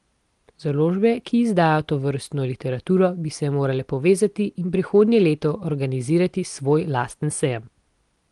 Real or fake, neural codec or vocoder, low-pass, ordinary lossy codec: real; none; 10.8 kHz; Opus, 24 kbps